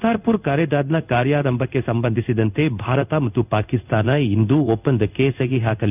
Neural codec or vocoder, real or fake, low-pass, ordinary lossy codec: codec, 16 kHz in and 24 kHz out, 1 kbps, XY-Tokenizer; fake; 3.6 kHz; none